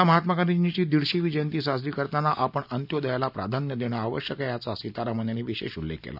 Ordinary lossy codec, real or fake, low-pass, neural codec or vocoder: none; real; 5.4 kHz; none